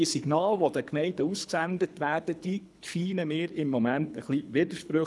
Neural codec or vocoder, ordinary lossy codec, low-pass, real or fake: codec, 24 kHz, 3 kbps, HILCodec; none; none; fake